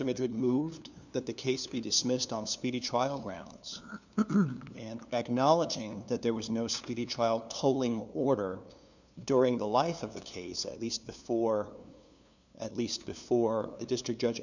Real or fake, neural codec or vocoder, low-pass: fake; codec, 16 kHz, 2 kbps, FunCodec, trained on LibriTTS, 25 frames a second; 7.2 kHz